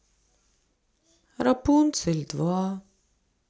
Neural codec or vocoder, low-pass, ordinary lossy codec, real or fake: none; none; none; real